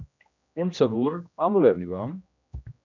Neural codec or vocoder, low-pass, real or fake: codec, 16 kHz, 1 kbps, X-Codec, HuBERT features, trained on general audio; 7.2 kHz; fake